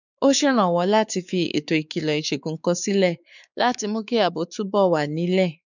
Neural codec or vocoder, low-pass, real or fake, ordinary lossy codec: codec, 16 kHz, 4 kbps, X-Codec, WavLM features, trained on Multilingual LibriSpeech; 7.2 kHz; fake; none